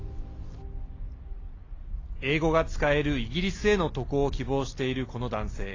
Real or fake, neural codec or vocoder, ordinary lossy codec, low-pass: real; none; AAC, 32 kbps; 7.2 kHz